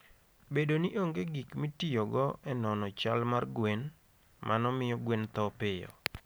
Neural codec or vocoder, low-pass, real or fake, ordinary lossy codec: none; none; real; none